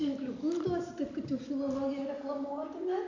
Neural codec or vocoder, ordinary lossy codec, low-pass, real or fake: none; MP3, 64 kbps; 7.2 kHz; real